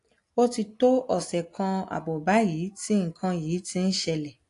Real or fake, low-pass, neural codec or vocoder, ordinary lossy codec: real; 10.8 kHz; none; AAC, 48 kbps